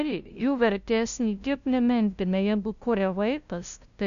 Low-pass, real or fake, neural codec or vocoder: 7.2 kHz; fake; codec, 16 kHz, 0.5 kbps, FunCodec, trained on LibriTTS, 25 frames a second